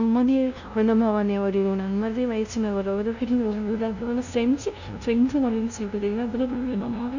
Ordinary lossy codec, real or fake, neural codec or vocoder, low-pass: none; fake; codec, 16 kHz, 0.5 kbps, FunCodec, trained on LibriTTS, 25 frames a second; 7.2 kHz